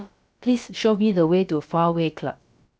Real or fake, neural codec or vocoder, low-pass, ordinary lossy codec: fake; codec, 16 kHz, about 1 kbps, DyCAST, with the encoder's durations; none; none